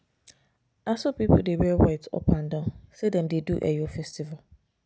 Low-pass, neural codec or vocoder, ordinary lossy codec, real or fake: none; none; none; real